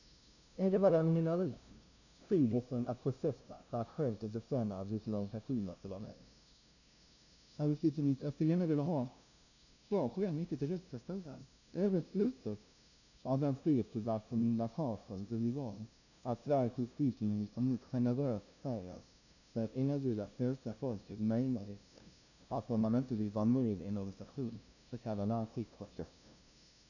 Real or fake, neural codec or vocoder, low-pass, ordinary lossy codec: fake; codec, 16 kHz, 0.5 kbps, FunCodec, trained on LibriTTS, 25 frames a second; 7.2 kHz; none